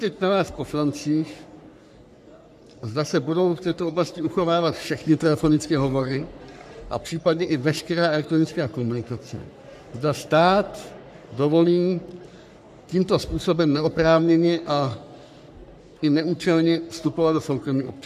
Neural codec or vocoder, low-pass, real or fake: codec, 44.1 kHz, 3.4 kbps, Pupu-Codec; 14.4 kHz; fake